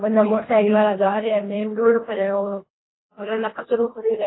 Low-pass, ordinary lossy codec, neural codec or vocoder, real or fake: 7.2 kHz; AAC, 16 kbps; codec, 24 kHz, 1.5 kbps, HILCodec; fake